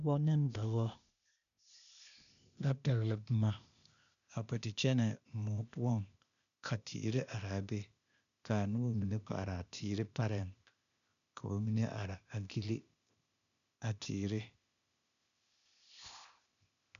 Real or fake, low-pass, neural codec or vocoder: fake; 7.2 kHz; codec, 16 kHz, 0.8 kbps, ZipCodec